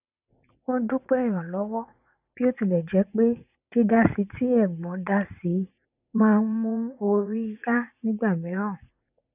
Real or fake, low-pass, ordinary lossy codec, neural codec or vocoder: fake; 3.6 kHz; none; vocoder, 44.1 kHz, 80 mel bands, Vocos